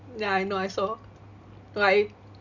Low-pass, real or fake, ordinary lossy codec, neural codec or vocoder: 7.2 kHz; real; none; none